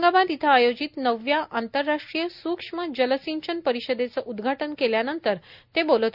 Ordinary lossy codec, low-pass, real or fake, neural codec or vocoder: none; 5.4 kHz; real; none